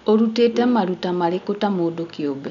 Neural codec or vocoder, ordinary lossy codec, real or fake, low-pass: none; none; real; 7.2 kHz